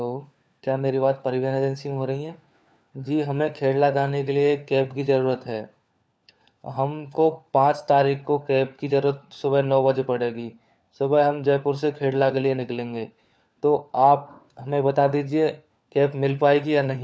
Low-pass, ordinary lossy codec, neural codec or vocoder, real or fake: none; none; codec, 16 kHz, 4 kbps, FunCodec, trained on LibriTTS, 50 frames a second; fake